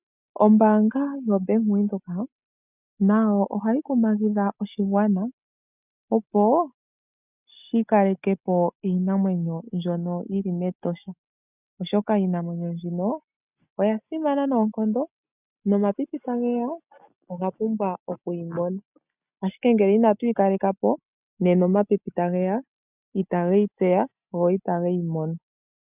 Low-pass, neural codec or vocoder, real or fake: 3.6 kHz; none; real